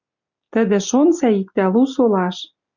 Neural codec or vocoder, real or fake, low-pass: none; real; 7.2 kHz